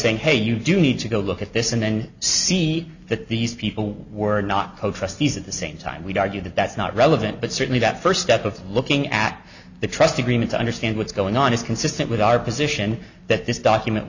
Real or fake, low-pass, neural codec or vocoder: real; 7.2 kHz; none